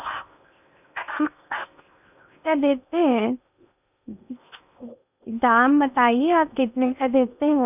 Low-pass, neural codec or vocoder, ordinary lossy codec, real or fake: 3.6 kHz; codec, 16 kHz in and 24 kHz out, 0.8 kbps, FocalCodec, streaming, 65536 codes; none; fake